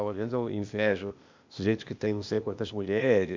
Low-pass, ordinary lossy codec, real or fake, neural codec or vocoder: 7.2 kHz; MP3, 64 kbps; fake; codec, 16 kHz, 0.8 kbps, ZipCodec